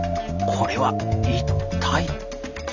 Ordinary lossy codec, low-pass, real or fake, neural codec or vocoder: none; 7.2 kHz; real; none